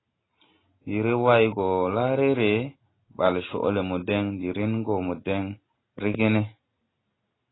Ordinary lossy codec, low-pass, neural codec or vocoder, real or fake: AAC, 16 kbps; 7.2 kHz; none; real